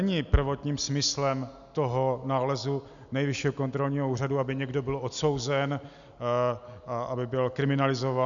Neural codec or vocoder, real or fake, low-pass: none; real; 7.2 kHz